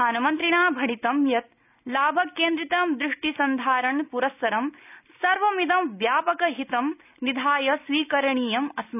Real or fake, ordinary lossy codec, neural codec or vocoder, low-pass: real; none; none; 3.6 kHz